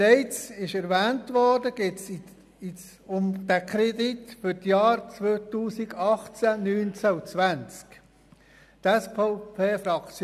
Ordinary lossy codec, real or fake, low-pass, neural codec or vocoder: none; real; 14.4 kHz; none